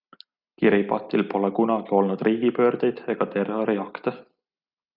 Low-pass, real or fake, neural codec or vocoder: 5.4 kHz; real; none